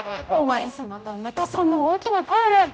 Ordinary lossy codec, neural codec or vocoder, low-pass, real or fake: none; codec, 16 kHz, 0.5 kbps, X-Codec, HuBERT features, trained on general audio; none; fake